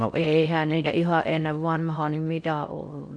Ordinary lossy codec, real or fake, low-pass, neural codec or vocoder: none; fake; 9.9 kHz; codec, 16 kHz in and 24 kHz out, 0.6 kbps, FocalCodec, streaming, 4096 codes